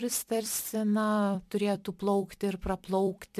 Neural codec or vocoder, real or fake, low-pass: vocoder, 44.1 kHz, 128 mel bands every 256 samples, BigVGAN v2; fake; 14.4 kHz